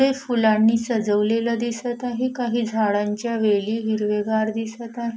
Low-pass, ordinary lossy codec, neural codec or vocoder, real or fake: none; none; none; real